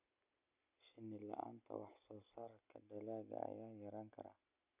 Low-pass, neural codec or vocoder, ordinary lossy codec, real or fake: 3.6 kHz; none; none; real